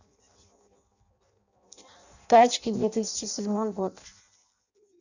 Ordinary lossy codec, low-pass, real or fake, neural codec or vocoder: none; 7.2 kHz; fake; codec, 16 kHz in and 24 kHz out, 0.6 kbps, FireRedTTS-2 codec